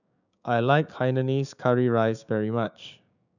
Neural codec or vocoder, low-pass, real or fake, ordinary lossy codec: codec, 16 kHz, 6 kbps, DAC; 7.2 kHz; fake; none